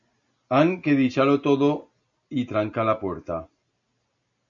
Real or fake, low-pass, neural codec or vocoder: real; 7.2 kHz; none